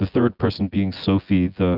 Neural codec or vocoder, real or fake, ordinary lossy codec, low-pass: vocoder, 24 kHz, 100 mel bands, Vocos; fake; Opus, 24 kbps; 5.4 kHz